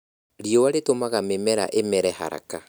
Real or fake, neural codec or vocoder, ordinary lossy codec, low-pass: real; none; none; none